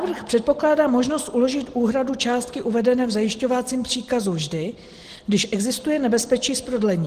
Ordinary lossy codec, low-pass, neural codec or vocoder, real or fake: Opus, 16 kbps; 14.4 kHz; none; real